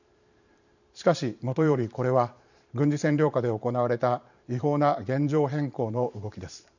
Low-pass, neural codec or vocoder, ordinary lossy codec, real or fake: 7.2 kHz; vocoder, 44.1 kHz, 128 mel bands every 512 samples, BigVGAN v2; none; fake